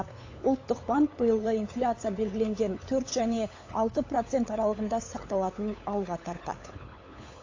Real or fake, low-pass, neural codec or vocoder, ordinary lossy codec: fake; 7.2 kHz; codec, 16 kHz, 8 kbps, FunCodec, trained on LibriTTS, 25 frames a second; AAC, 32 kbps